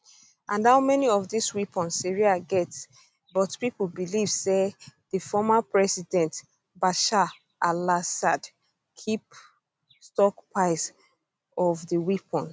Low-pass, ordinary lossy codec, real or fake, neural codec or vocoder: none; none; real; none